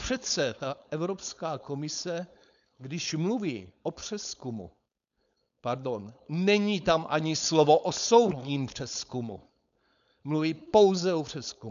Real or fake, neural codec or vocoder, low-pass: fake; codec, 16 kHz, 4.8 kbps, FACodec; 7.2 kHz